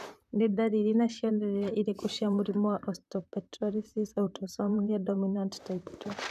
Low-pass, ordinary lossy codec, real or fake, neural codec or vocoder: 14.4 kHz; none; fake; vocoder, 44.1 kHz, 128 mel bands, Pupu-Vocoder